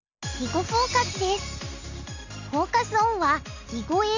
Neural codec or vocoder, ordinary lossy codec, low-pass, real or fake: none; none; 7.2 kHz; real